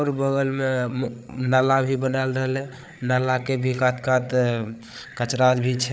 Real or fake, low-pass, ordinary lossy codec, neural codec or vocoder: fake; none; none; codec, 16 kHz, 8 kbps, FreqCodec, larger model